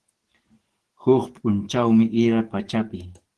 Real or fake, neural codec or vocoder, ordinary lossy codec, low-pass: fake; codec, 44.1 kHz, 7.8 kbps, DAC; Opus, 16 kbps; 10.8 kHz